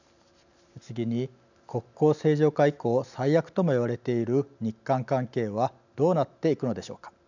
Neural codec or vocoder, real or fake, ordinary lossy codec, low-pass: none; real; none; 7.2 kHz